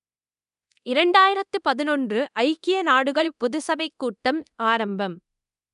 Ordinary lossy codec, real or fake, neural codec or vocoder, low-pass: none; fake; codec, 24 kHz, 0.9 kbps, DualCodec; 10.8 kHz